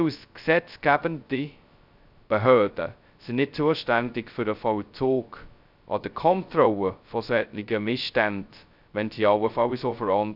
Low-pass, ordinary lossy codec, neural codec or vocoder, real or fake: 5.4 kHz; none; codec, 16 kHz, 0.2 kbps, FocalCodec; fake